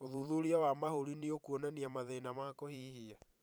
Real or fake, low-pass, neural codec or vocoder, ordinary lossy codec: fake; none; vocoder, 44.1 kHz, 128 mel bands every 512 samples, BigVGAN v2; none